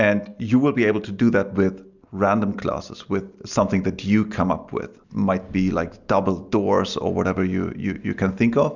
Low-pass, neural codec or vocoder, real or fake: 7.2 kHz; none; real